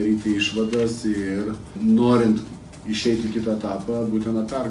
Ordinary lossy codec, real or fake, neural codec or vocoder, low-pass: AAC, 64 kbps; real; none; 10.8 kHz